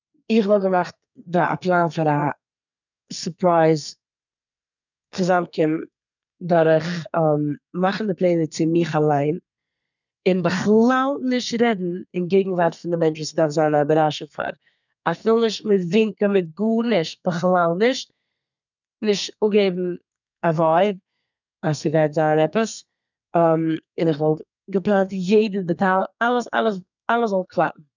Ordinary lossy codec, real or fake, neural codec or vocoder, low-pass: none; fake; codec, 32 kHz, 1.9 kbps, SNAC; 7.2 kHz